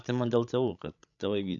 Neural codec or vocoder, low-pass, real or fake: codec, 16 kHz, 16 kbps, FreqCodec, larger model; 7.2 kHz; fake